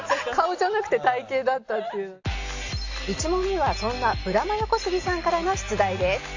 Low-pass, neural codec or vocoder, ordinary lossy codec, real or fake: 7.2 kHz; none; AAC, 48 kbps; real